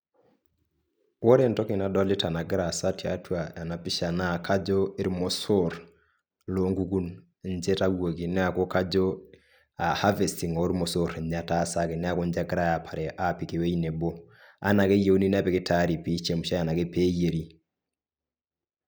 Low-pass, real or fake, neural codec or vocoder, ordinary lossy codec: none; real; none; none